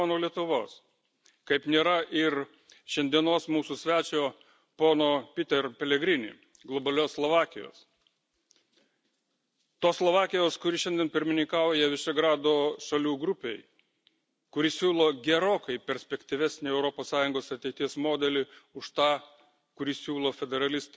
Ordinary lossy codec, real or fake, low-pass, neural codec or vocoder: none; real; none; none